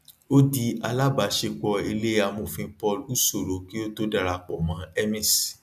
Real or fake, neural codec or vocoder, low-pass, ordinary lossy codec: real; none; 14.4 kHz; none